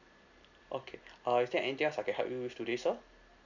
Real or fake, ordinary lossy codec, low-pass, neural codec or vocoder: real; none; 7.2 kHz; none